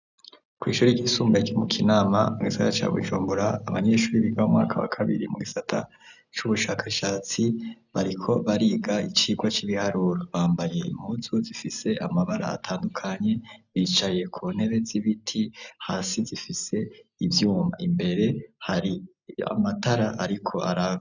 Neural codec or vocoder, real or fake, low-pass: none; real; 7.2 kHz